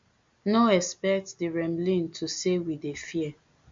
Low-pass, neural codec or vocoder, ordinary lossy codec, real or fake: 7.2 kHz; none; MP3, 48 kbps; real